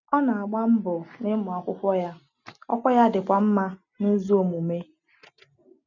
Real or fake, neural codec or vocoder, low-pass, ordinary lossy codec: real; none; 7.2 kHz; none